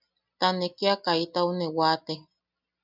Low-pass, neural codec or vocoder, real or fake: 5.4 kHz; none; real